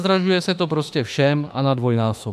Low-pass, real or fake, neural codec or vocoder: 14.4 kHz; fake; autoencoder, 48 kHz, 32 numbers a frame, DAC-VAE, trained on Japanese speech